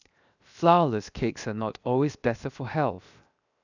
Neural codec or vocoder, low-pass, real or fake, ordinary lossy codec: codec, 16 kHz, 0.7 kbps, FocalCodec; 7.2 kHz; fake; none